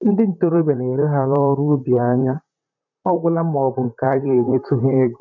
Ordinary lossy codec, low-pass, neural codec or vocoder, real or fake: MP3, 64 kbps; 7.2 kHz; vocoder, 44.1 kHz, 128 mel bands, Pupu-Vocoder; fake